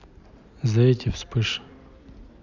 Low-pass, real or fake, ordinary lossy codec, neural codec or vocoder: 7.2 kHz; real; none; none